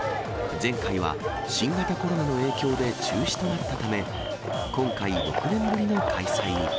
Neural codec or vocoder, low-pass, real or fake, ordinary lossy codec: none; none; real; none